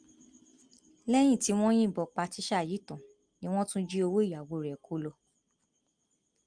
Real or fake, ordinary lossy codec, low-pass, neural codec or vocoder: real; Opus, 32 kbps; 9.9 kHz; none